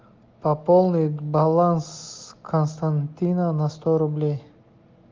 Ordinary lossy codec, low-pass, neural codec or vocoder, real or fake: Opus, 32 kbps; 7.2 kHz; none; real